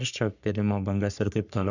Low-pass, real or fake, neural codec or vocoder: 7.2 kHz; fake; codec, 44.1 kHz, 3.4 kbps, Pupu-Codec